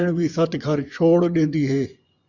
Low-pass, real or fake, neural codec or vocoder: 7.2 kHz; fake; vocoder, 24 kHz, 100 mel bands, Vocos